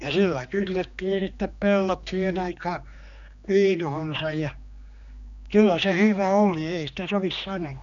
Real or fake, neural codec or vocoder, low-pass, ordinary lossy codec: fake; codec, 16 kHz, 2 kbps, X-Codec, HuBERT features, trained on general audio; 7.2 kHz; none